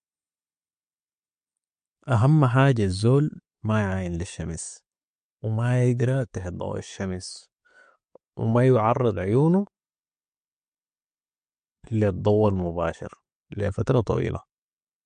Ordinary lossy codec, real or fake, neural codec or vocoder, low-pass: MP3, 48 kbps; real; none; 9.9 kHz